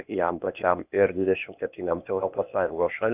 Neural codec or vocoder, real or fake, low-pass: codec, 16 kHz, 0.8 kbps, ZipCodec; fake; 3.6 kHz